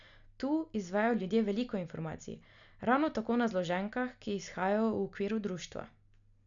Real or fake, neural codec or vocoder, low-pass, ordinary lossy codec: real; none; 7.2 kHz; none